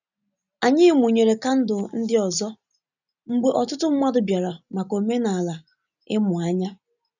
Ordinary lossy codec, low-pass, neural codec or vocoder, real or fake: none; 7.2 kHz; none; real